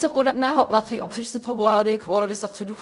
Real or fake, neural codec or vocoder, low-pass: fake; codec, 16 kHz in and 24 kHz out, 0.4 kbps, LongCat-Audio-Codec, fine tuned four codebook decoder; 10.8 kHz